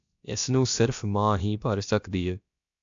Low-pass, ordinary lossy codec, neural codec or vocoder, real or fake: 7.2 kHz; AAC, 64 kbps; codec, 16 kHz, about 1 kbps, DyCAST, with the encoder's durations; fake